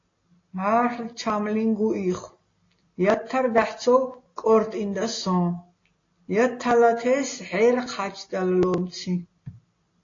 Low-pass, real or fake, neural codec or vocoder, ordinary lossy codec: 7.2 kHz; real; none; AAC, 32 kbps